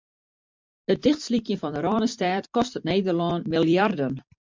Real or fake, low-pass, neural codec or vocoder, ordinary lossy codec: fake; 7.2 kHz; vocoder, 44.1 kHz, 128 mel bands every 256 samples, BigVGAN v2; MP3, 64 kbps